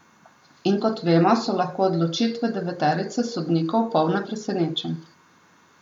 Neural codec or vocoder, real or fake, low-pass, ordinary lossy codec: none; real; 19.8 kHz; none